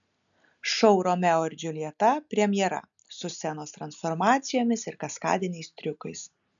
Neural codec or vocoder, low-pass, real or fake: none; 7.2 kHz; real